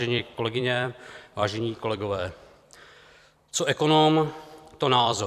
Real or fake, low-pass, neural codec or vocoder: fake; 14.4 kHz; vocoder, 44.1 kHz, 128 mel bands every 256 samples, BigVGAN v2